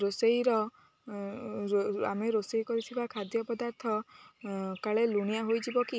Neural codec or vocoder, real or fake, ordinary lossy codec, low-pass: none; real; none; none